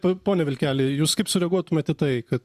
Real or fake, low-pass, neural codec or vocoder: fake; 14.4 kHz; vocoder, 44.1 kHz, 128 mel bands every 512 samples, BigVGAN v2